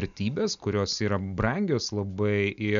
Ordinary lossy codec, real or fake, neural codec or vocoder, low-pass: MP3, 96 kbps; real; none; 7.2 kHz